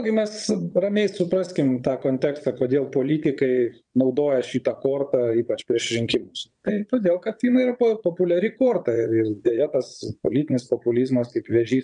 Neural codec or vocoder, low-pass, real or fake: vocoder, 22.05 kHz, 80 mel bands, Vocos; 9.9 kHz; fake